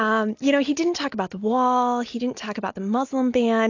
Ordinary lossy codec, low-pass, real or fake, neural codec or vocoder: AAC, 48 kbps; 7.2 kHz; real; none